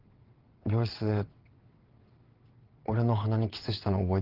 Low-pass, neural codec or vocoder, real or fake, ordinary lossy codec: 5.4 kHz; vocoder, 22.05 kHz, 80 mel bands, WaveNeXt; fake; Opus, 16 kbps